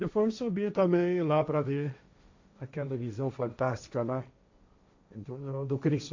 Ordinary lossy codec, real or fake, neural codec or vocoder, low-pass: AAC, 48 kbps; fake; codec, 16 kHz, 1.1 kbps, Voila-Tokenizer; 7.2 kHz